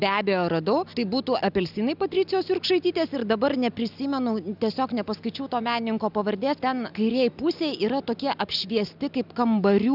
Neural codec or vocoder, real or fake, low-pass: none; real; 5.4 kHz